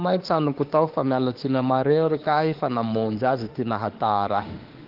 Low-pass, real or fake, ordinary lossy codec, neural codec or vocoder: 5.4 kHz; fake; Opus, 16 kbps; autoencoder, 48 kHz, 32 numbers a frame, DAC-VAE, trained on Japanese speech